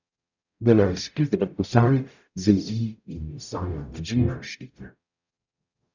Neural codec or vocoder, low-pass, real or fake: codec, 44.1 kHz, 0.9 kbps, DAC; 7.2 kHz; fake